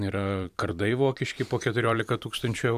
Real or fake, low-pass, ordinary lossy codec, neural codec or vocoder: fake; 14.4 kHz; AAC, 96 kbps; vocoder, 44.1 kHz, 128 mel bands every 512 samples, BigVGAN v2